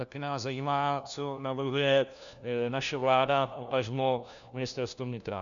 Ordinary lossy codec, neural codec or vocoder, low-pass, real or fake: MP3, 96 kbps; codec, 16 kHz, 1 kbps, FunCodec, trained on LibriTTS, 50 frames a second; 7.2 kHz; fake